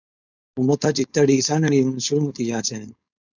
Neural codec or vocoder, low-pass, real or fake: codec, 16 kHz, 4.8 kbps, FACodec; 7.2 kHz; fake